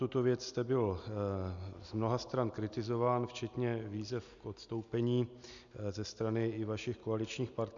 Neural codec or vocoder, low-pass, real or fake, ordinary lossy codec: none; 7.2 kHz; real; MP3, 96 kbps